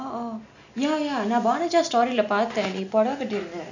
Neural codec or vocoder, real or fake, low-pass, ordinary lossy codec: none; real; 7.2 kHz; none